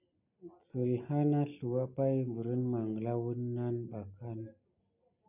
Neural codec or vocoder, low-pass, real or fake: none; 3.6 kHz; real